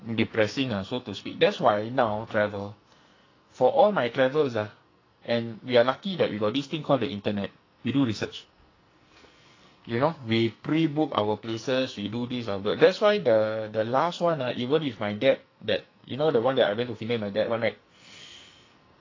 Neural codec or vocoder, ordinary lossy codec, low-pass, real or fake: codec, 44.1 kHz, 2.6 kbps, SNAC; AAC, 32 kbps; 7.2 kHz; fake